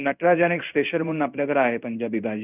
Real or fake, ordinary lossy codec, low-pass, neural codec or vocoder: fake; none; 3.6 kHz; codec, 16 kHz in and 24 kHz out, 1 kbps, XY-Tokenizer